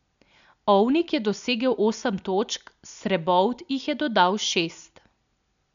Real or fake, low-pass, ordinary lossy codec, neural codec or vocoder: real; 7.2 kHz; none; none